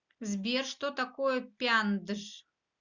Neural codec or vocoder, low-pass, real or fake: none; 7.2 kHz; real